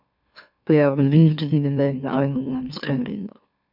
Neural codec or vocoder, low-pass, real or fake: autoencoder, 44.1 kHz, a latent of 192 numbers a frame, MeloTTS; 5.4 kHz; fake